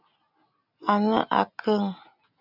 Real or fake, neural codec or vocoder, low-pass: real; none; 5.4 kHz